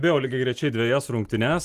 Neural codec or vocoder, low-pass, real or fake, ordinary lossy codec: none; 14.4 kHz; real; Opus, 24 kbps